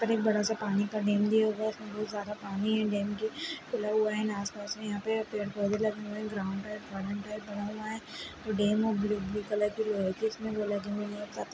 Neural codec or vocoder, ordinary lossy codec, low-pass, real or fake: none; none; none; real